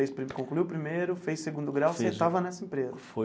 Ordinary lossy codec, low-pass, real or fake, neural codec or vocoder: none; none; real; none